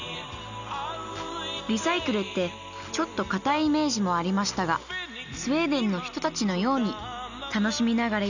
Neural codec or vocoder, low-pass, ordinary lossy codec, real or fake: none; 7.2 kHz; none; real